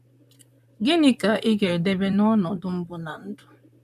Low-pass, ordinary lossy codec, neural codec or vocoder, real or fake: 14.4 kHz; none; vocoder, 44.1 kHz, 128 mel bands, Pupu-Vocoder; fake